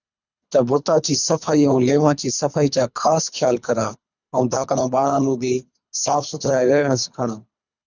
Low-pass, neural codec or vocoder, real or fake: 7.2 kHz; codec, 24 kHz, 3 kbps, HILCodec; fake